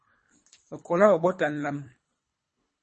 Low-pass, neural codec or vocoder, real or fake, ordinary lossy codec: 10.8 kHz; codec, 24 kHz, 3 kbps, HILCodec; fake; MP3, 32 kbps